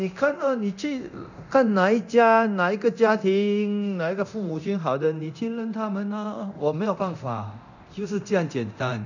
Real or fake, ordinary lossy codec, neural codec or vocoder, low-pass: fake; none; codec, 24 kHz, 0.9 kbps, DualCodec; 7.2 kHz